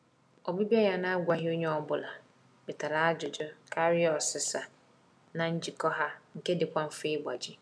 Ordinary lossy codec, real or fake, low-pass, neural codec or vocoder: none; real; none; none